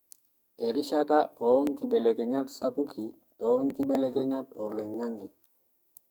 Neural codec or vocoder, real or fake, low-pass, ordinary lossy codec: codec, 44.1 kHz, 2.6 kbps, SNAC; fake; none; none